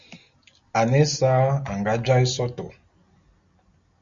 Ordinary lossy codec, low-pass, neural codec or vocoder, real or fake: Opus, 64 kbps; 7.2 kHz; none; real